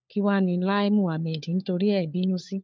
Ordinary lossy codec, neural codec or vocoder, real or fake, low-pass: none; codec, 16 kHz, 4 kbps, FunCodec, trained on LibriTTS, 50 frames a second; fake; none